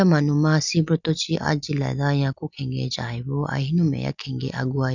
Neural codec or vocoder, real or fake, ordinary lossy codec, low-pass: none; real; none; 7.2 kHz